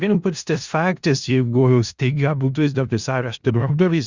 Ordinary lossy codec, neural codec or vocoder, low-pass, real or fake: Opus, 64 kbps; codec, 16 kHz in and 24 kHz out, 0.4 kbps, LongCat-Audio-Codec, four codebook decoder; 7.2 kHz; fake